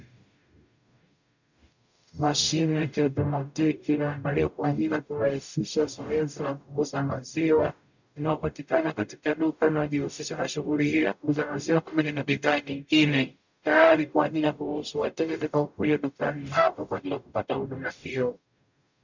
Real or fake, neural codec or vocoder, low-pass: fake; codec, 44.1 kHz, 0.9 kbps, DAC; 7.2 kHz